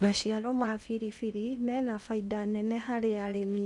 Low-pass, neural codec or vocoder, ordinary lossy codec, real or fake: 10.8 kHz; codec, 16 kHz in and 24 kHz out, 0.8 kbps, FocalCodec, streaming, 65536 codes; none; fake